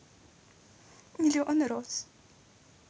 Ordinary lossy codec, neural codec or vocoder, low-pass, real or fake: none; none; none; real